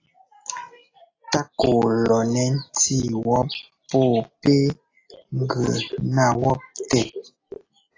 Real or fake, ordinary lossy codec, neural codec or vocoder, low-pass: real; AAC, 32 kbps; none; 7.2 kHz